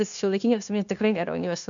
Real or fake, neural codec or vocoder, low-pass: fake; codec, 16 kHz, 0.9 kbps, LongCat-Audio-Codec; 7.2 kHz